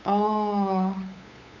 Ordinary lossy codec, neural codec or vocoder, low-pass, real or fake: none; codec, 16 kHz, 8 kbps, FunCodec, trained on LibriTTS, 25 frames a second; 7.2 kHz; fake